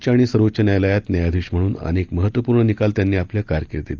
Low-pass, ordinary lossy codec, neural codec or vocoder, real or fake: 7.2 kHz; Opus, 32 kbps; none; real